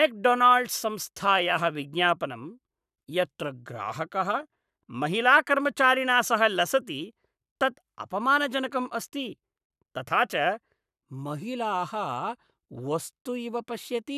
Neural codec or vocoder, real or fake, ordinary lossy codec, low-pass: codec, 44.1 kHz, 7.8 kbps, Pupu-Codec; fake; none; 14.4 kHz